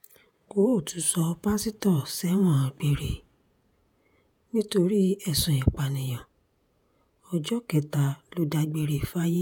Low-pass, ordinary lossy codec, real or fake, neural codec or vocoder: 19.8 kHz; none; fake; vocoder, 48 kHz, 128 mel bands, Vocos